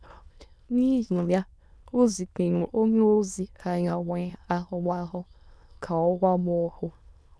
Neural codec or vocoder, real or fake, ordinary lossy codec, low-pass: autoencoder, 22.05 kHz, a latent of 192 numbers a frame, VITS, trained on many speakers; fake; none; none